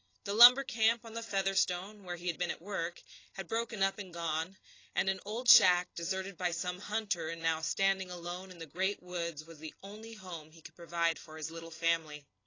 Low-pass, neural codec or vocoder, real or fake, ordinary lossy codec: 7.2 kHz; none; real; AAC, 32 kbps